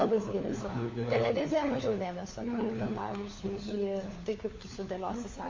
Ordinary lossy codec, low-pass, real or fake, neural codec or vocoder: MP3, 32 kbps; 7.2 kHz; fake; codec, 16 kHz, 4 kbps, FunCodec, trained on LibriTTS, 50 frames a second